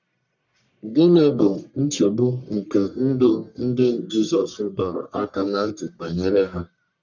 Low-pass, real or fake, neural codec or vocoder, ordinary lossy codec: 7.2 kHz; fake; codec, 44.1 kHz, 1.7 kbps, Pupu-Codec; none